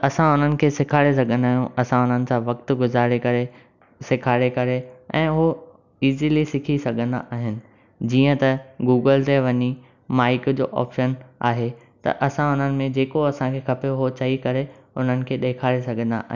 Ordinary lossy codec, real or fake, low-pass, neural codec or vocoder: none; real; 7.2 kHz; none